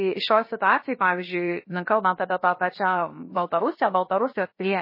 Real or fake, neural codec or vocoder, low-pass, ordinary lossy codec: fake; codec, 16 kHz, 0.7 kbps, FocalCodec; 5.4 kHz; MP3, 24 kbps